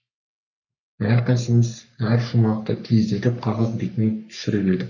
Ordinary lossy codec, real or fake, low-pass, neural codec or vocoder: none; fake; 7.2 kHz; codec, 44.1 kHz, 3.4 kbps, Pupu-Codec